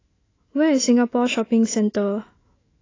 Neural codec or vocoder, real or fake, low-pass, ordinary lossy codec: autoencoder, 48 kHz, 128 numbers a frame, DAC-VAE, trained on Japanese speech; fake; 7.2 kHz; AAC, 32 kbps